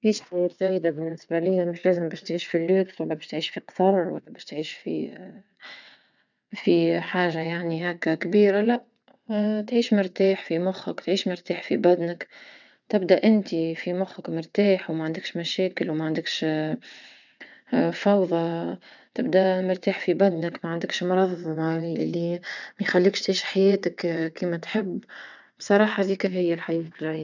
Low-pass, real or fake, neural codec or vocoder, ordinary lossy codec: 7.2 kHz; fake; vocoder, 22.05 kHz, 80 mel bands, WaveNeXt; none